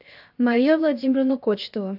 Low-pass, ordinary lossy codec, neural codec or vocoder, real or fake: 5.4 kHz; AAC, 48 kbps; codec, 16 kHz, 0.7 kbps, FocalCodec; fake